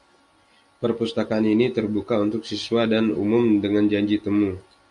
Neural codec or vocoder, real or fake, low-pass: none; real; 10.8 kHz